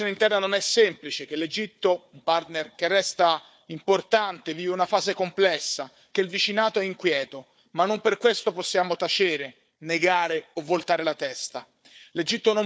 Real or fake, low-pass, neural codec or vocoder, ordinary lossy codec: fake; none; codec, 16 kHz, 4 kbps, FunCodec, trained on Chinese and English, 50 frames a second; none